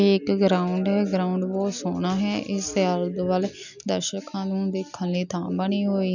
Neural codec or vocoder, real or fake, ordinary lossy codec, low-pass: none; real; none; 7.2 kHz